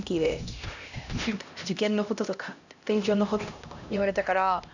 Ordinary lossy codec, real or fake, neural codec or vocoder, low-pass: none; fake; codec, 16 kHz, 1 kbps, X-Codec, HuBERT features, trained on LibriSpeech; 7.2 kHz